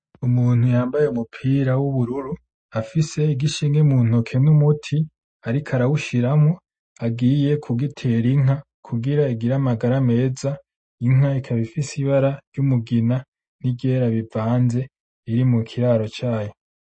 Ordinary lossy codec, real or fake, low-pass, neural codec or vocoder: MP3, 32 kbps; real; 9.9 kHz; none